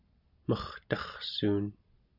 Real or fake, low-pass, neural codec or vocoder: real; 5.4 kHz; none